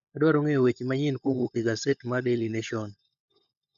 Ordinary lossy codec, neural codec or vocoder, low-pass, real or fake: none; codec, 16 kHz, 16 kbps, FunCodec, trained on LibriTTS, 50 frames a second; 7.2 kHz; fake